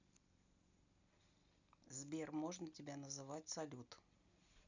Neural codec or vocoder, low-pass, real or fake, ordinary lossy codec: none; 7.2 kHz; real; none